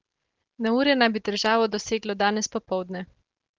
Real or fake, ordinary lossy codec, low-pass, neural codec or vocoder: real; Opus, 16 kbps; 7.2 kHz; none